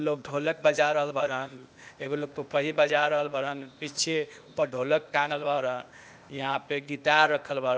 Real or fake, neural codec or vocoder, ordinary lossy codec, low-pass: fake; codec, 16 kHz, 0.8 kbps, ZipCodec; none; none